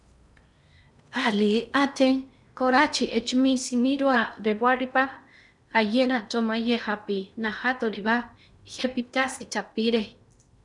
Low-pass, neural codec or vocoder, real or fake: 10.8 kHz; codec, 16 kHz in and 24 kHz out, 0.6 kbps, FocalCodec, streaming, 4096 codes; fake